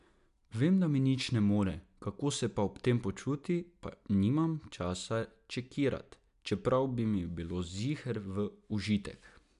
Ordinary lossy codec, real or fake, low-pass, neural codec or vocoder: AAC, 96 kbps; real; 10.8 kHz; none